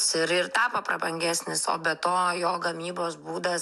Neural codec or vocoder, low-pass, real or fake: none; 14.4 kHz; real